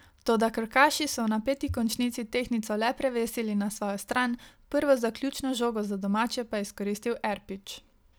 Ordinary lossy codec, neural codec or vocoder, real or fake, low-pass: none; none; real; none